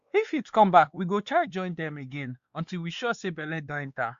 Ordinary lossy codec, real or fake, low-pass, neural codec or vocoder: Opus, 64 kbps; fake; 7.2 kHz; codec, 16 kHz, 2 kbps, X-Codec, WavLM features, trained on Multilingual LibriSpeech